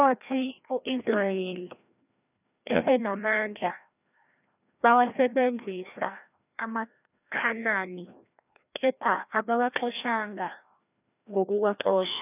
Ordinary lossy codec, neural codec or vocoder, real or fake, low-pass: none; codec, 16 kHz, 1 kbps, FreqCodec, larger model; fake; 3.6 kHz